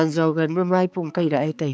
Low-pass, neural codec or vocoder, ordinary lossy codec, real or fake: none; codec, 16 kHz, 4 kbps, X-Codec, HuBERT features, trained on balanced general audio; none; fake